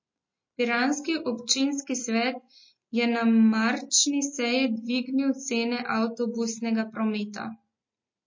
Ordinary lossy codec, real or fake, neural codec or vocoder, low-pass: MP3, 32 kbps; real; none; 7.2 kHz